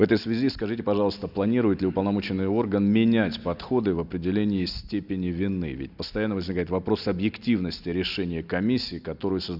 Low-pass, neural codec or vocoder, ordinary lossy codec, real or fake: 5.4 kHz; none; none; real